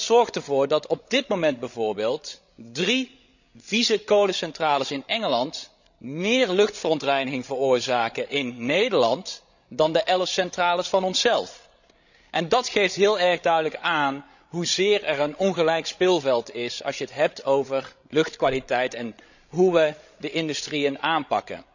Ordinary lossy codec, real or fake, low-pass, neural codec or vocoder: none; fake; 7.2 kHz; codec, 16 kHz, 16 kbps, FreqCodec, larger model